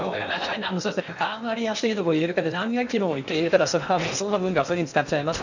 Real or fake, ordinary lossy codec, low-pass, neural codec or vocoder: fake; none; 7.2 kHz; codec, 16 kHz in and 24 kHz out, 0.8 kbps, FocalCodec, streaming, 65536 codes